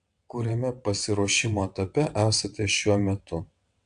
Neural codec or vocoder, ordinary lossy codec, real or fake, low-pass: vocoder, 22.05 kHz, 80 mel bands, WaveNeXt; AAC, 64 kbps; fake; 9.9 kHz